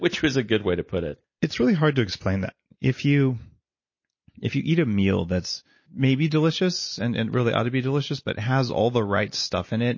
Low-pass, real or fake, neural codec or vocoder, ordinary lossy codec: 7.2 kHz; real; none; MP3, 32 kbps